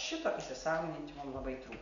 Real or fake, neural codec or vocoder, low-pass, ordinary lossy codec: real; none; 7.2 kHz; MP3, 96 kbps